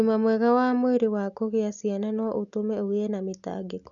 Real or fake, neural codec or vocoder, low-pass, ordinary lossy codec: real; none; 7.2 kHz; none